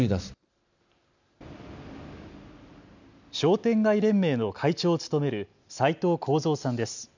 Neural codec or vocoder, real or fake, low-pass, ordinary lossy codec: none; real; 7.2 kHz; none